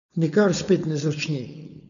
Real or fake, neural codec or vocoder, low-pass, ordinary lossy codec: fake; codec, 16 kHz, 4.8 kbps, FACodec; 7.2 kHz; none